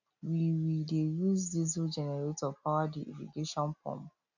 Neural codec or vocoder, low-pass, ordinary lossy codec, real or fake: none; 7.2 kHz; none; real